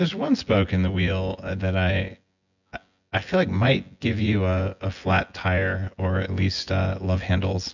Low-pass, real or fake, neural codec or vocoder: 7.2 kHz; fake; vocoder, 24 kHz, 100 mel bands, Vocos